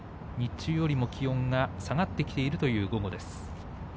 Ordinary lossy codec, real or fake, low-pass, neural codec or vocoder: none; real; none; none